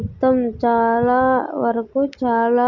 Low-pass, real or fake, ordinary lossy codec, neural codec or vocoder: 7.2 kHz; real; none; none